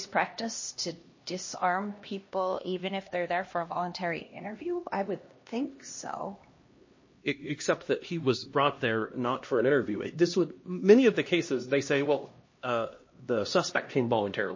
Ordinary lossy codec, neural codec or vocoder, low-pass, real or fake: MP3, 32 kbps; codec, 16 kHz, 1 kbps, X-Codec, HuBERT features, trained on LibriSpeech; 7.2 kHz; fake